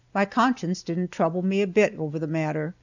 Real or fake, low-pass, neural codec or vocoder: real; 7.2 kHz; none